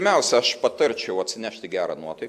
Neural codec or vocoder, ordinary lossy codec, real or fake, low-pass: none; AAC, 96 kbps; real; 14.4 kHz